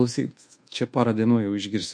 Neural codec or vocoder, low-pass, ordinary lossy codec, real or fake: codec, 24 kHz, 1.2 kbps, DualCodec; 9.9 kHz; MP3, 48 kbps; fake